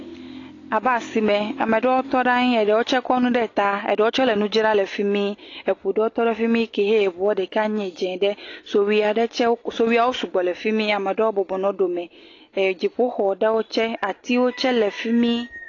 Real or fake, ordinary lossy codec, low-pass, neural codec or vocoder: real; AAC, 32 kbps; 7.2 kHz; none